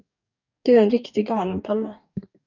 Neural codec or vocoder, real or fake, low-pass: codec, 44.1 kHz, 2.6 kbps, DAC; fake; 7.2 kHz